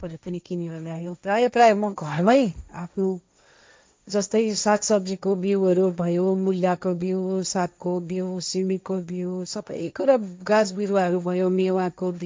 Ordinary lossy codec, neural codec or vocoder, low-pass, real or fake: none; codec, 16 kHz, 1.1 kbps, Voila-Tokenizer; none; fake